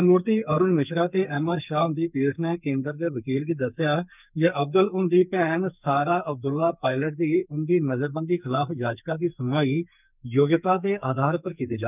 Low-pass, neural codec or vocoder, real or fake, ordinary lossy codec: 3.6 kHz; codec, 44.1 kHz, 2.6 kbps, SNAC; fake; none